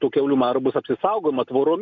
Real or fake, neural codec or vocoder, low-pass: real; none; 7.2 kHz